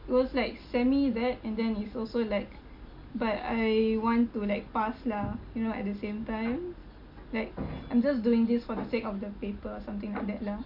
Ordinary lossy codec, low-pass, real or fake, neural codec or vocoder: MP3, 48 kbps; 5.4 kHz; real; none